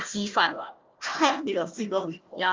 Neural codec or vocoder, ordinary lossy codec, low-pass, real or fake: codec, 16 kHz, 1 kbps, FunCodec, trained on Chinese and English, 50 frames a second; Opus, 32 kbps; 7.2 kHz; fake